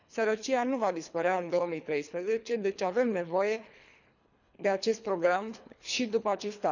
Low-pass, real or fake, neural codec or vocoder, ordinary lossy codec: 7.2 kHz; fake; codec, 24 kHz, 3 kbps, HILCodec; none